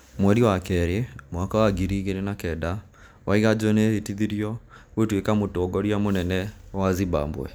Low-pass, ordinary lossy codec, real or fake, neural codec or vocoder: none; none; real; none